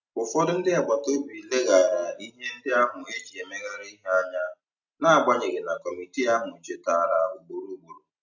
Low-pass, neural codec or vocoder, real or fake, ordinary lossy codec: 7.2 kHz; none; real; none